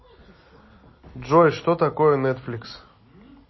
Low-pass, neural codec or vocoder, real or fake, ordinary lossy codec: 7.2 kHz; none; real; MP3, 24 kbps